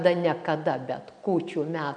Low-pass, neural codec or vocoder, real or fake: 9.9 kHz; none; real